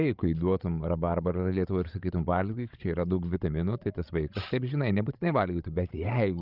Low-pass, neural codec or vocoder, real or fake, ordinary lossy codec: 5.4 kHz; codec, 16 kHz, 16 kbps, FreqCodec, larger model; fake; Opus, 24 kbps